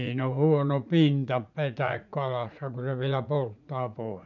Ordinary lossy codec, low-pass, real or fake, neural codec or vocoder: none; 7.2 kHz; fake; vocoder, 44.1 kHz, 80 mel bands, Vocos